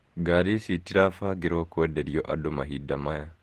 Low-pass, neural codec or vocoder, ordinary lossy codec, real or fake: 14.4 kHz; vocoder, 48 kHz, 128 mel bands, Vocos; Opus, 16 kbps; fake